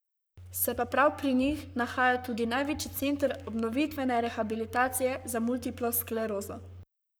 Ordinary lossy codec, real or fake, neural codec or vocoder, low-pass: none; fake; codec, 44.1 kHz, 7.8 kbps, Pupu-Codec; none